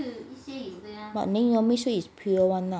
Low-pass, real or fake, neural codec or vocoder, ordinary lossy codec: none; real; none; none